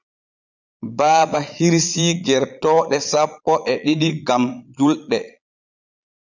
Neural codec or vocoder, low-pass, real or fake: vocoder, 22.05 kHz, 80 mel bands, Vocos; 7.2 kHz; fake